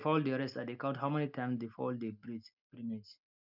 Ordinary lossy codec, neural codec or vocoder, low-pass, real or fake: none; none; 5.4 kHz; real